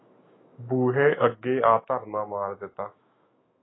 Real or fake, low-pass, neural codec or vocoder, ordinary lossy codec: fake; 7.2 kHz; autoencoder, 48 kHz, 128 numbers a frame, DAC-VAE, trained on Japanese speech; AAC, 16 kbps